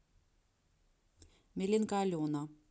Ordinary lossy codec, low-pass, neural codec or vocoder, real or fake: none; none; none; real